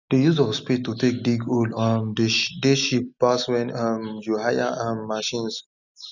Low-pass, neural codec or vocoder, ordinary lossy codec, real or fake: 7.2 kHz; none; none; real